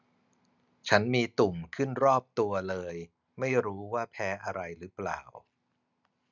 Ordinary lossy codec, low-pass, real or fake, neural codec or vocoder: none; 7.2 kHz; real; none